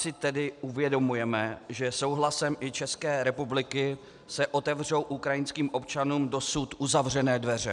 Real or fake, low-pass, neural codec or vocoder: real; 10.8 kHz; none